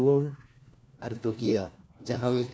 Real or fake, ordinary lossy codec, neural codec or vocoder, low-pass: fake; none; codec, 16 kHz, 1 kbps, FunCodec, trained on LibriTTS, 50 frames a second; none